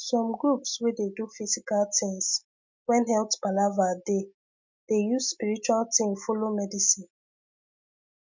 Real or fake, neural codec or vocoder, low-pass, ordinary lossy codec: real; none; 7.2 kHz; MP3, 64 kbps